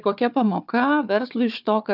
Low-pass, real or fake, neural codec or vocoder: 5.4 kHz; fake; codec, 16 kHz, 4 kbps, FunCodec, trained on Chinese and English, 50 frames a second